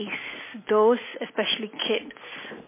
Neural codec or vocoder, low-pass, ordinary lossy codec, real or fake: none; 3.6 kHz; MP3, 16 kbps; real